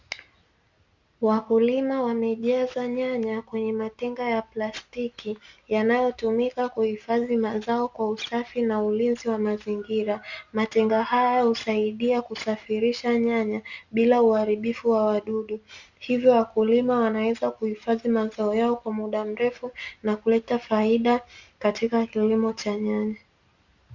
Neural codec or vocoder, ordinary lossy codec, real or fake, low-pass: none; Opus, 64 kbps; real; 7.2 kHz